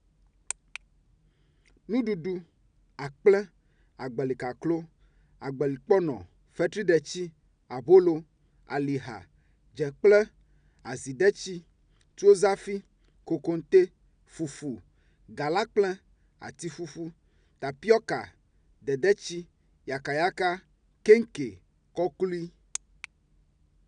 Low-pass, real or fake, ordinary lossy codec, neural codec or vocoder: 9.9 kHz; real; none; none